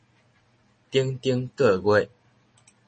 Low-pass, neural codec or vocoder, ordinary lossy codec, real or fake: 10.8 kHz; none; MP3, 32 kbps; real